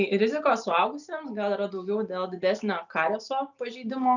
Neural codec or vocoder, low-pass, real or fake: none; 7.2 kHz; real